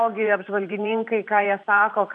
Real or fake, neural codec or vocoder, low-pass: fake; vocoder, 44.1 kHz, 128 mel bands every 512 samples, BigVGAN v2; 10.8 kHz